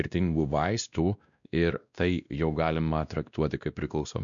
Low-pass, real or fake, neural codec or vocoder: 7.2 kHz; fake; codec, 16 kHz, 1 kbps, X-Codec, WavLM features, trained on Multilingual LibriSpeech